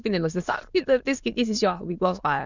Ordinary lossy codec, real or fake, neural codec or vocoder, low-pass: Opus, 64 kbps; fake; autoencoder, 22.05 kHz, a latent of 192 numbers a frame, VITS, trained on many speakers; 7.2 kHz